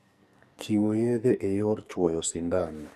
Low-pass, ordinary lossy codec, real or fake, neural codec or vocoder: 14.4 kHz; none; fake; codec, 44.1 kHz, 2.6 kbps, SNAC